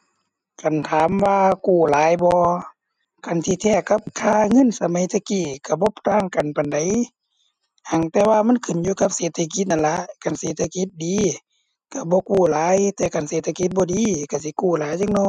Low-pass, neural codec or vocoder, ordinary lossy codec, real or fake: 9.9 kHz; none; none; real